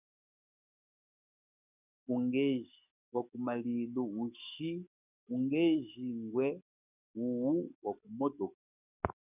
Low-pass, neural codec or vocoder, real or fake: 3.6 kHz; codec, 44.1 kHz, 7.8 kbps, DAC; fake